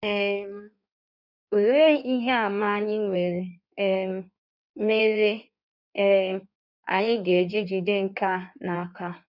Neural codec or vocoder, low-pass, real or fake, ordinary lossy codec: codec, 16 kHz in and 24 kHz out, 1.1 kbps, FireRedTTS-2 codec; 5.4 kHz; fake; none